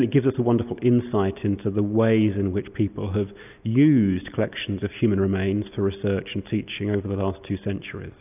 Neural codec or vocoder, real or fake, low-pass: none; real; 3.6 kHz